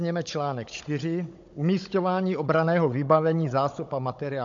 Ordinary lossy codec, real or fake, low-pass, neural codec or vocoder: MP3, 48 kbps; fake; 7.2 kHz; codec, 16 kHz, 16 kbps, FunCodec, trained on Chinese and English, 50 frames a second